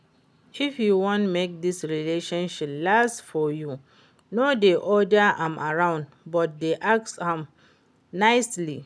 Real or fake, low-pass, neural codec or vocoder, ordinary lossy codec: real; none; none; none